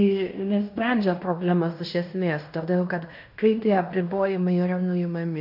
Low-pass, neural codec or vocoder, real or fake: 5.4 kHz; codec, 16 kHz in and 24 kHz out, 0.9 kbps, LongCat-Audio-Codec, fine tuned four codebook decoder; fake